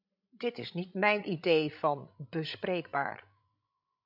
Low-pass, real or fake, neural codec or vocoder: 5.4 kHz; fake; codec, 16 kHz, 16 kbps, FreqCodec, larger model